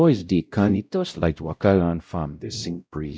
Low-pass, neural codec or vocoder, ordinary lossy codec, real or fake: none; codec, 16 kHz, 0.5 kbps, X-Codec, WavLM features, trained on Multilingual LibriSpeech; none; fake